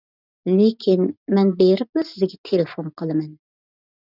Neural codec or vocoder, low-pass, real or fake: none; 5.4 kHz; real